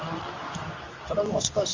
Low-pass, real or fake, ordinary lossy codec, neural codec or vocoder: 7.2 kHz; fake; Opus, 32 kbps; codec, 24 kHz, 0.9 kbps, WavTokenizer, medium speech release version 2